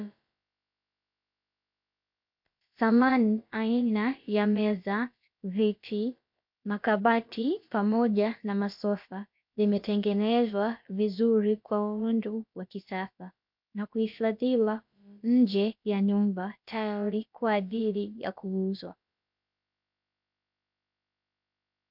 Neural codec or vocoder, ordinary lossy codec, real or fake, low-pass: codec, 16 kHz, about 1 kbps, DyCAST, with the encoder's durations; MP3, 48 kbps; fake; 5.4 kHz